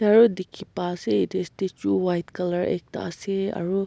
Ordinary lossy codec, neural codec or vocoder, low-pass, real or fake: none; none; none; real